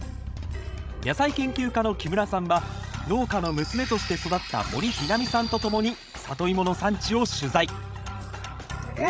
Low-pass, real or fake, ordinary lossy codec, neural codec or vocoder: none; fake; none; codec, 16 kHz, 16 kbps, FreqCodec, larger model